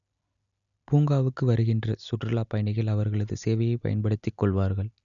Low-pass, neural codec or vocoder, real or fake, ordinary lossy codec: 7.2 kHz; none; real; none